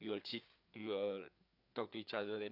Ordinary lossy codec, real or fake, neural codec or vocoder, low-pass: none; fake; codec, 16 kHz, 4 kbps, FreqCodec, larger model; 5.4 kHz